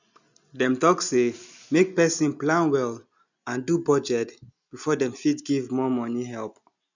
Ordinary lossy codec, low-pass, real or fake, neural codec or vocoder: none; 7.2 kHz; real; none